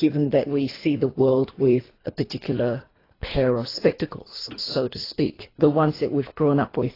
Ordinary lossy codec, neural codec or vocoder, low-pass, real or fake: AAC, 24 kbps; codec, 24 kHz, 3 kbps, HILCodec; 5.4 kHz; fake